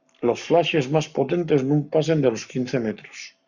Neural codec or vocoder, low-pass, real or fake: codec, 44.1 kHz, 7.8 kbps, Pupu-Codec; 7.2 kHz; fake